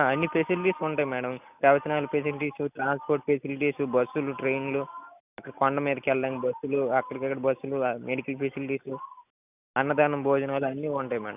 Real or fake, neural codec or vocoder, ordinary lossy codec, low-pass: real; none; none; 3.6 kHz